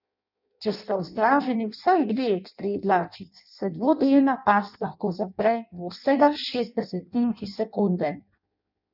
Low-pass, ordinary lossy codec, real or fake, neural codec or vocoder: 5.4 kHz; none; fake; codec, 16 kHz in and 24 kHz out, 0.6 kbps, FireRedTTS-2 codec